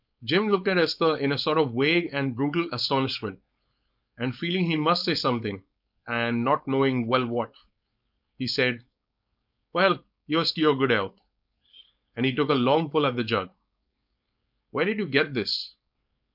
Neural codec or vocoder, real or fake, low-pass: codec, 16 kHz, 4.8 kbps, FACodec; fake; 5.4 kHz